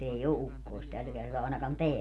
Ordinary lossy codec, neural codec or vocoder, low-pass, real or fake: none; none; none; real